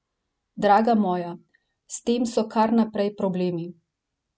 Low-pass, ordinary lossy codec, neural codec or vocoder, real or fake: none; none; none; real